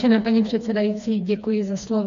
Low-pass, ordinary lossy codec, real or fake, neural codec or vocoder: 7.2 kHz; AAC, 64 kbps; fake; codec, 16 kHz, 2 kbps, FreqCodec, smaller model